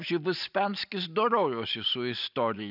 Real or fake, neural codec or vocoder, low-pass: real; none; 5.4 kHz